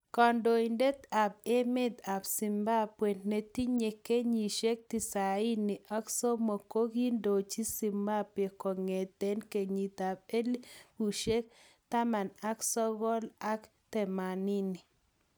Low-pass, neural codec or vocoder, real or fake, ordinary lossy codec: none; none; real; none